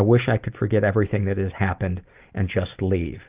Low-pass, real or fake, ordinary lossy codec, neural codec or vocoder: 3.6 kHz; real; Opus, 32 kbps; none